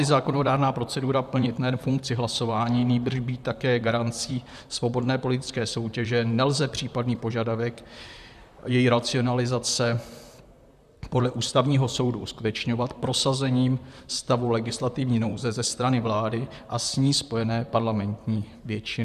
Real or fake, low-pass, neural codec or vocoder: fake; 14.4 kHz; vocoder, 44.1 kHz, 128 mel bands, Pupu-Vocoder